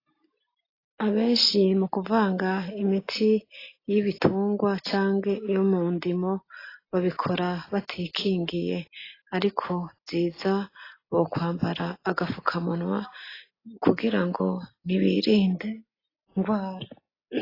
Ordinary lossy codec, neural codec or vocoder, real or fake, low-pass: AAC, 24 kbps; none; real; 5.4 kHz